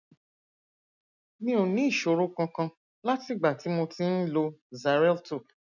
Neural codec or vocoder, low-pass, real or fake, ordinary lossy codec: none; 7.2 kHz; real; none